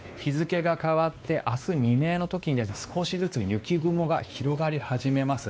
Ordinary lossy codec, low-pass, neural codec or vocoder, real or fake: none; none; codec, 16 kHz, 2 kbps, X-Codec, WavLM features, trained on Multilingual LibriSpeech; fake